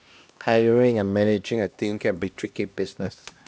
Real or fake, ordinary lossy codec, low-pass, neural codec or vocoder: fake; none; none; codec, 16 kHz, 1 kbps, X-Codec, HuBERT features, trained on LibriSpeech